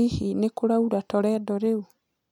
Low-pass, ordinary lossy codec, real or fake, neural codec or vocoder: 19.8 kHz; none; real; none